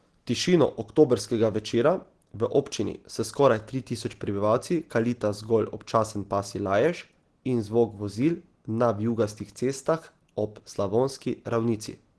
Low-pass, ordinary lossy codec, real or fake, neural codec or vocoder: 10.8 kHz; Opus, 16 kbps; real; none